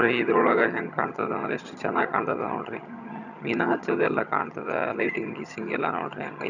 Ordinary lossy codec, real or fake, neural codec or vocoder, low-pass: none; fake; vocoder, 22.05 kHz, 80 mel bands, HiFi-GAN; 7.2 kHz